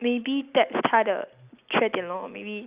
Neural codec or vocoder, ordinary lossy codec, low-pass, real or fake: none; Opus, 64 kbps; 3.6 kHz; real